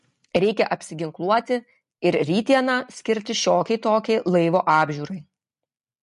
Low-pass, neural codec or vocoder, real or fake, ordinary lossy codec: 14.4 kHz; none; real; MP3, 48 kbps